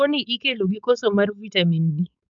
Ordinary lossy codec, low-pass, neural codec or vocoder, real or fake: none; 7.2 kHz; codec, 16 kHz, 4.8 kbps, FACodec; fake